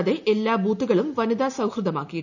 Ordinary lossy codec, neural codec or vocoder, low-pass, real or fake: none; none; 7.2 kHz; real